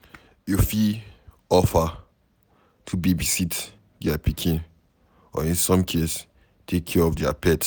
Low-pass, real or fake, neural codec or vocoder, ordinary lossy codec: none; real; none; none